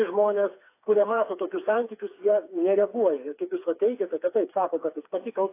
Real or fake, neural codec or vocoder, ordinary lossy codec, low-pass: fake; codec, 16 kHz, 4 kbps, FreqCodec, smaller model; AAC, 24 kbps; 3.6 kHz